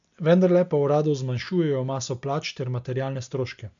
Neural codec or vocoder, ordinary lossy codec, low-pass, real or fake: none; MP3, 64 kbps; 7.2 kHz; real